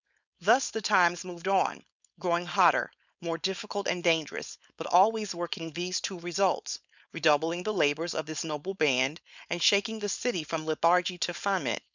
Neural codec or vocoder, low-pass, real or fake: codec, 16 kHz, 4.8 kbps, FACodec; 7.2 kHz; fake